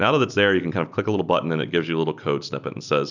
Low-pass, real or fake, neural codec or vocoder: 7.2 kHz; real; none